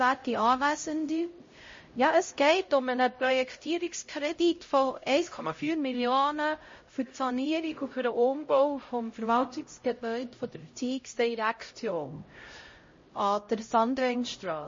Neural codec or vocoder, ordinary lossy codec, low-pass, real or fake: codec, 16 kHz, 0.5 kbps, X-Codec, HuBERT features, trained on LibriSpeech; MP3, 32 kbps; 7.2 kHz; fake